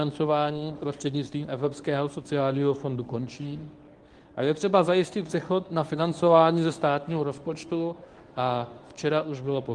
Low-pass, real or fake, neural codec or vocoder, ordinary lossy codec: 10.8 kHz; fake; codec, 24 kHz, 0.9 kbps, WavTokenizer, medium speech release version 1; Opus, 24 kbps